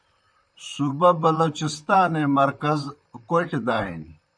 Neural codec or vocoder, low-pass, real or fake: vocoder, 44.1 kHz, 128 mel bands, Pupu-Vocoder; 9.9 kHz; fake